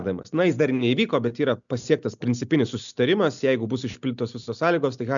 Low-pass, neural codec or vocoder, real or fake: 7.2 kHz; none; real